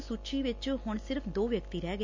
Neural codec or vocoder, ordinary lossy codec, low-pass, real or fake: none; MP3, 64 kbps; 7.2 kHz; real